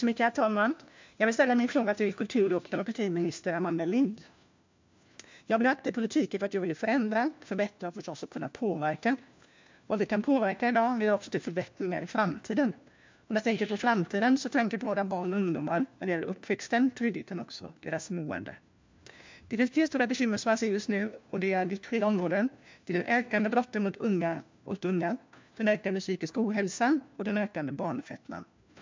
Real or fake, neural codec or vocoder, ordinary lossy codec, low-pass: fake; codec, 16 kHz, 1 kbps, FunCodec, trained on LibriTTS, 50 frames a second; MP3, 64 kbps; 7.2 kHz